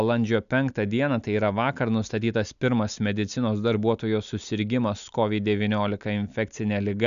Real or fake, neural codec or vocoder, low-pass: real; none; 7.2 kHz